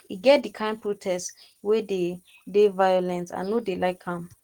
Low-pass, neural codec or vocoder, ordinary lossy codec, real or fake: 19.8 kHz; none; Opus, 16 kbps; real